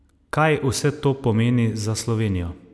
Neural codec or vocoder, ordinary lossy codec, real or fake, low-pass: none; none; real; none